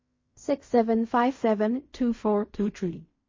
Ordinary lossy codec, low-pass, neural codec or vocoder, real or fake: MP3, 32 kbps; 7.2 kHz; codec, 16 kHz in and 24 kHz out, 0.4 kbps, LongCat-Audio-Codec, fine tuned four codebook decoder; fake